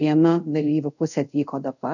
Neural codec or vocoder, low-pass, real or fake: codec, 24 kHz, 0.5 kbps, DualCodec; 7.2 kHz; fake